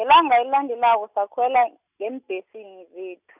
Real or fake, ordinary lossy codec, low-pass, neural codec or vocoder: real; none; 3.6 kHz; none